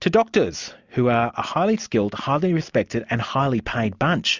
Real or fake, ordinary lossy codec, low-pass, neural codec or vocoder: real; Opus, 64 kbps; 7.2 kHz; none